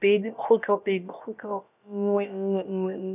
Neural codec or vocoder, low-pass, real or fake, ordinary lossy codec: codec, 16 kHz, about 1 kbps, DyCAST, with the encoder's durations; 3.6 kHz; fake; none